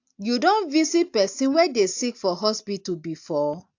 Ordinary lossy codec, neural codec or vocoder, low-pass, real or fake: AAC, 48 kbps; none; 7.2 kHz; real